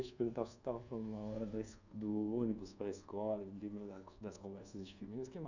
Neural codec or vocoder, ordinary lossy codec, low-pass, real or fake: codec, 24 kHz, 1.2 kbps, DualCodec; none; 7.2 kHz; fake